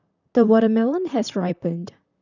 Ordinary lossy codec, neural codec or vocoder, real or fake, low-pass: none; vocoder, 44.1 kHz, 128 mel bands, Pupu-Vocoder; fake; 7.2 kHz